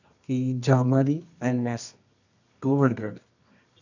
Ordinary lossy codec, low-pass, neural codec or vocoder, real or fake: none; 7.2 kHz; codec, 24 kHz, 0.9 kbps, WavTokenizer, medium music audio release; fake